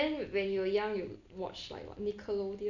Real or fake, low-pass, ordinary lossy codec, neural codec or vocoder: real; 7.2 kHz; none; none